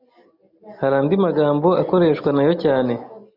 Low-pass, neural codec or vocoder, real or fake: 5.4 kHz; none; real